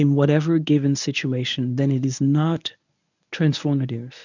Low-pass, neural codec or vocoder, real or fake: 7.2 kHz; codec, 24 kHz, 0.9 kbps, WavTokenizer, medium speech release version 1; fake